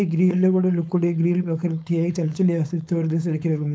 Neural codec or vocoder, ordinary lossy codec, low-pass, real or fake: codec, 16 kHz, 4.8 kbps, FACodec; none; none; fake